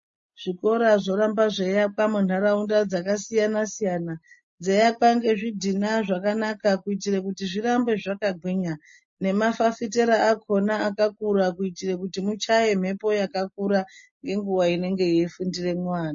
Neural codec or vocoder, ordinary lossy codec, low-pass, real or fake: none; MP3, 32 kbps; 7.2 kHz; real